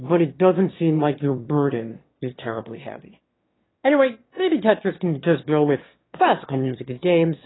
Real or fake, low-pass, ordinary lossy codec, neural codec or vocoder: fake; 7.2 kHz; AAC, 16 kbps; autoencoder, 22.05 kHz, a latent of 192 numbers a frame, VITS, trained on one speaker